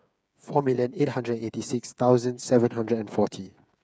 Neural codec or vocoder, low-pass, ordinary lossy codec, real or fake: codec, 16 kHz, 8 kbps, FreqCodec, smaller model; none; none; fake